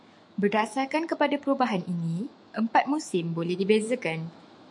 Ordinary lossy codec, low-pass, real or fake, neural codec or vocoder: MP3, 64 kbps; 10.8 kHz; fake; autoencoder, 48 kHz, 128 numbers a frame, DAC-VAE, trained on Japanese speech